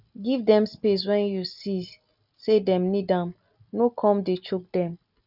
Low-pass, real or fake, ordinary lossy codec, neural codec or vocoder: 5.4 kHz; real; none; none